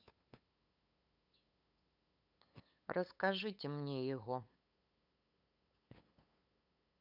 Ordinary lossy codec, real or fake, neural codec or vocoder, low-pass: none; fake; codec, 16 kHz, 8 kbps, FunCodec, trained on LibriTTS, 25 frames a second; 5.4 kHz